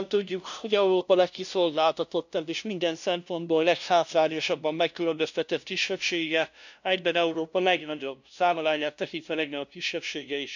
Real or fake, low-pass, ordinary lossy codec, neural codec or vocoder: fake; 7.2 kHz; none; codec, 16 kHz, 0.5 kbps, FunCodec, trained on LibriTTS, 25 frames a second